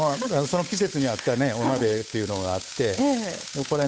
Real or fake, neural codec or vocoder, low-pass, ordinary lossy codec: fake; codec, 16 kHz, 8 kbps, FunCodec, trained on Chinese and English, 25 frames a second; none; none